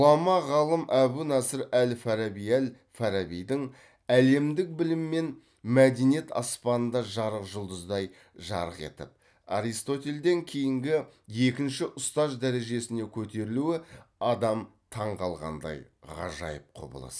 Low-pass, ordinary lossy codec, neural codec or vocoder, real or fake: none; none; none; real